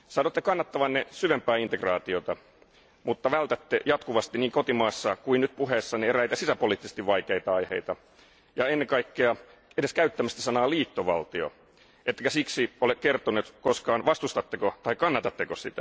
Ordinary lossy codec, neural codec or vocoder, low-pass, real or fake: none; none; none; real